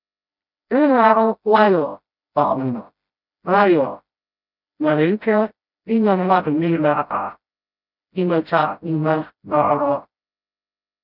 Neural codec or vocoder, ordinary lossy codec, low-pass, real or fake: codec, 16 kHz, 0.5 kbps, FreqCodec, smaller model; none; 5.4 kHz; fake